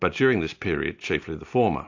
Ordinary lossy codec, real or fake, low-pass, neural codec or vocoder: AAC, 48 kbps; real; 7.2 kHz; none